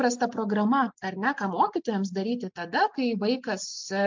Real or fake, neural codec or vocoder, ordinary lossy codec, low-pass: real; none; MP3, 64 kbps; 7.2 kHz